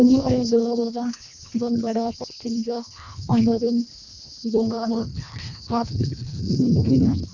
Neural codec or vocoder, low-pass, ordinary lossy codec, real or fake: codec, 24 kHz, 1.5 kbps, HILCodec; 7.2 kHz; none; fake